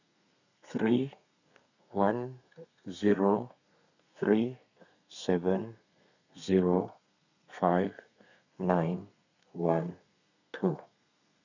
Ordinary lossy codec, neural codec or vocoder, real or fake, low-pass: none; codec, 32 kHz, 1.9 kbps, SNAC; fake; 7.2 kHz